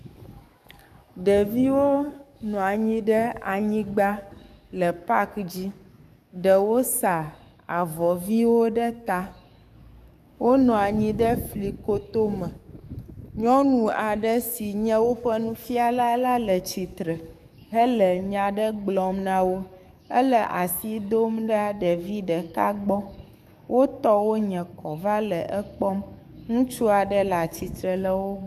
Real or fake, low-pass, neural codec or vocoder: fake; 14.4 kHz; codec, 44.1 kHz, 7.8 kbps, DAC